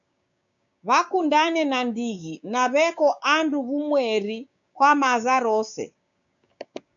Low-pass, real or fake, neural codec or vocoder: 7.2 kHz; fake; codec, 16 kHz, 6 kbps, DAC